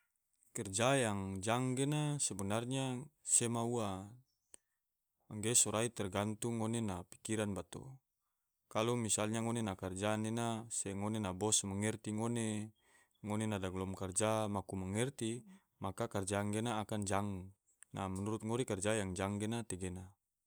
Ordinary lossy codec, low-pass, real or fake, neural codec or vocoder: none; none; real; none